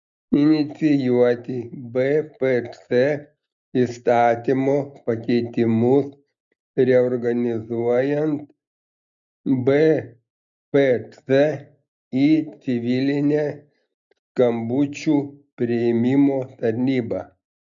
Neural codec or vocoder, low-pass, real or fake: none; 7.2 kHz; real